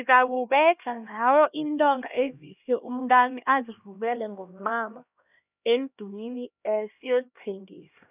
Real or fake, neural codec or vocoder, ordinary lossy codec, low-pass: fake; codec, 16 kHz, 1 kbps, X-Codec, HuBERT features, trained on LibriSpeech; none; 3.6 kHz